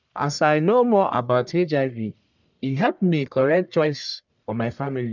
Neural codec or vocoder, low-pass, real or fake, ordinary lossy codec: codec, 44.1 kHz, 1.7 kbps, Pupu-Codec; 7.2 kHz; fake; none